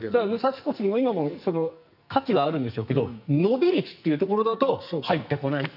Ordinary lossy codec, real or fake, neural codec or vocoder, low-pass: none; fake; codec, 44.1 kHz, 2.6 kbps, SNAC; 5.4 kHz